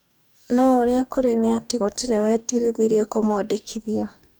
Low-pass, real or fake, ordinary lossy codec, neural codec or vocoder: none; fake; none; codec, 44.1 kHz, 2.6 kbps, DAC